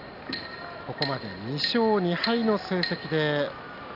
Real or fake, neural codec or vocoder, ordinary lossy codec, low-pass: real; none; none; 5.4 kHz